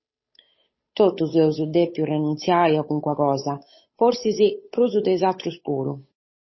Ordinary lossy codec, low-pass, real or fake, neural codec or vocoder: MP3, 24 kbps; 7.2 kHz; fake; codec, 16 kHz, 8 kbps, FunCodec, trained on Chinese and English, 25 frames a second